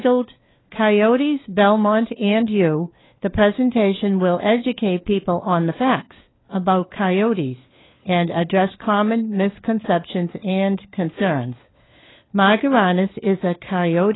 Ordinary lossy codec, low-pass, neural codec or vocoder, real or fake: AAC, 16 kbps; 7.2 kHz; codec, 16 kHz, 4 kbps, FunCodec, trained on LibriTTS, 50 frames a second; fake